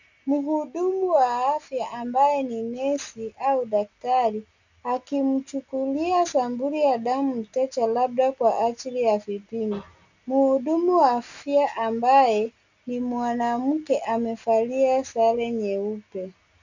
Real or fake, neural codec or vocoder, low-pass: real; none; 7.2 kHz